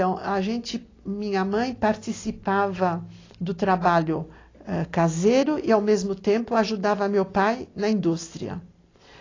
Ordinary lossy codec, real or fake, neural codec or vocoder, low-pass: AAC, 32 kbps; real; none; 7.2 kHz